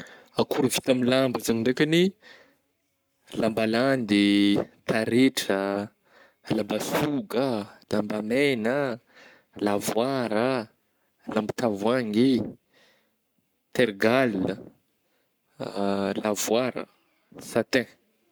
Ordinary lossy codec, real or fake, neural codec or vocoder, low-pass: none; fake; codec, 44.1 kHz, 7.8 kbps, Pupu-Codec; none